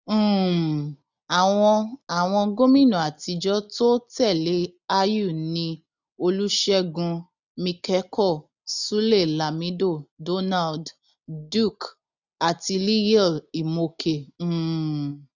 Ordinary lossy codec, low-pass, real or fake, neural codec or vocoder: none; 7.2 kHz; real; none